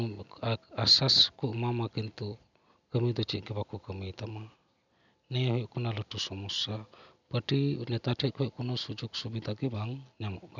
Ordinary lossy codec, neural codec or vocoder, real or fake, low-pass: none; none; real; 7.2 kHz